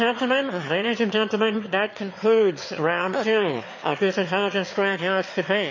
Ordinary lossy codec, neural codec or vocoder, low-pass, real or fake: MP3, 32 kbps; autoencoder, 22.05 kHz, a latent of 192 numbers a frame, VITS, trained on one speaker; 7.2 kHz; fake